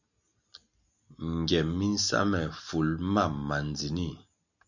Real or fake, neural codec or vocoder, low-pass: real; none; 7.2 kHz